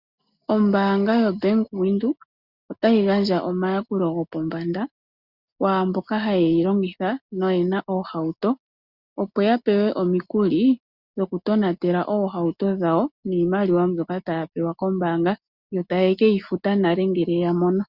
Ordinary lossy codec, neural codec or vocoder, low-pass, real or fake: Opus, 64 kbps; none; 5.4 kHz; real